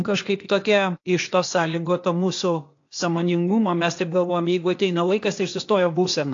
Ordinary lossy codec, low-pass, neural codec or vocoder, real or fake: AAC, 48 kbps; 7.2 kHz; codec, 16 kHz, 0.8 kbps, ZipCodec; fake